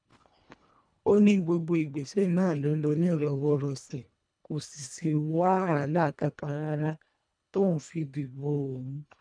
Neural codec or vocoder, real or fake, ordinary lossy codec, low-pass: codec, 24 kHz, 1.5 kbps, HILCodec; fake; none; 9.9 kHz